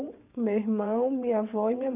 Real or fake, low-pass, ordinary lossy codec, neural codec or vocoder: fake; 3.6 kHz; none; vocoder, 22.05 kHz, 80 mel bands, WaveNeXt